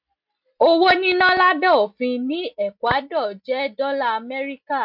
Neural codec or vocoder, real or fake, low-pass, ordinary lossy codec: none; real; 5.4 kHz; MP3, 48 kbps